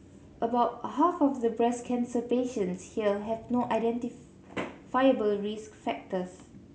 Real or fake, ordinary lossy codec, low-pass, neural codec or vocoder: real; none; none; none